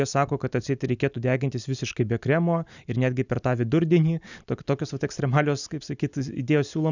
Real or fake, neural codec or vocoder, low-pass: real; none; 7.2 kHz